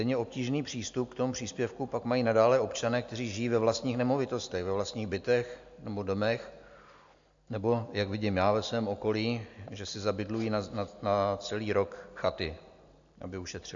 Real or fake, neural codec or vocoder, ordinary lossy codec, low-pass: real; none; AAC, 64 kbps; 7.2 kHz